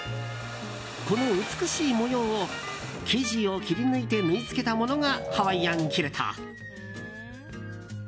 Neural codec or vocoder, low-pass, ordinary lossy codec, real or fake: none; none; none; real